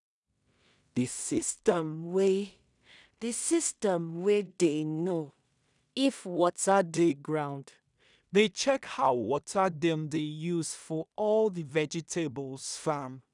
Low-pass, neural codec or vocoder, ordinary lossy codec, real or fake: 10.8 kHz; codec, 16 kHz in and 24 kHz out, 0.4 kbps, LongCat-Audio-Codec, two codebook decoder; none; fake